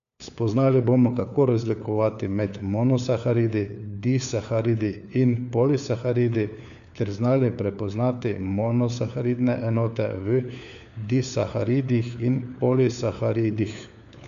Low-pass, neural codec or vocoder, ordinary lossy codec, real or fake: 7.2 kHz; codec, 16 kHz, 4 kbps, FunCodec, trained on LibriTTS, 50 frames a second; none; fake